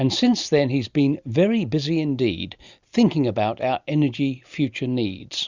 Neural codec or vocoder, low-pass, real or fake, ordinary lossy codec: none; 7.2 kHz; real; Opus, 64 kbps